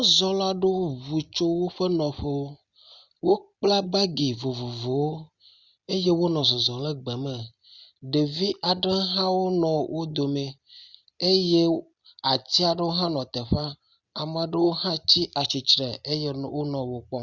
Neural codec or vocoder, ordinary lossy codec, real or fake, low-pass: none; Opus, 64 kbps; real; 7.2 kHz